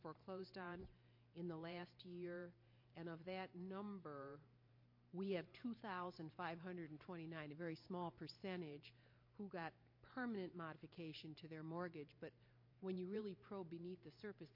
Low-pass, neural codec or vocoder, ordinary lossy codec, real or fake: 5.4 kHz; vocoder, 44.1 kHz, 128 mel bands every 512 samples, BigVGAN v2; MP3, 32 kbps; fake